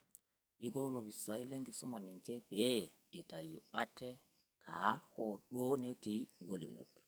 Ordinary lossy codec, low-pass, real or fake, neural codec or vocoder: none; none; fake; codec, 44.1 kHz, 2.6 kbps, SNAC